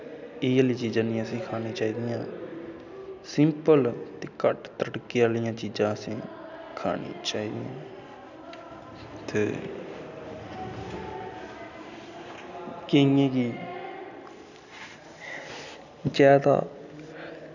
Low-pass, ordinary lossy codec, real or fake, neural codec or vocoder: 7.2 kHz; none; real; none